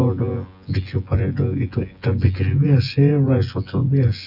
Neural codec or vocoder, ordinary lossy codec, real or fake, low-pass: vocoder, 24 kHz, 100 mel bands, Vocos; none; fake; 5.4 kHz